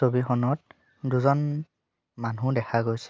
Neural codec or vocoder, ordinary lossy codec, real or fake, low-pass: none; none; real; none